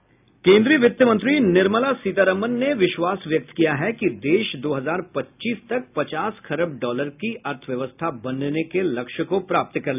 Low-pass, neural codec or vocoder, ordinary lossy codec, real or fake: 3.6 kHz; none; none; real